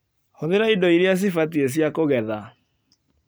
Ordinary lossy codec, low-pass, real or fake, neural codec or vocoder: none; none; real; none